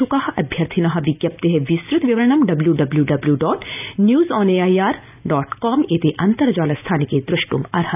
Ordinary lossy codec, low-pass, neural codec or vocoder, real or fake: none; 3.6 kHz; none; real